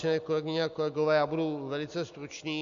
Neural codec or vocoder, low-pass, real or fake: none; 7.2 kHz; real